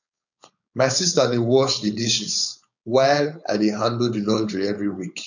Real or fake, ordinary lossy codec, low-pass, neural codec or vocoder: fake; none; 7.2 kHz; codec, 16 kHz, 4.8 kbps, FACodec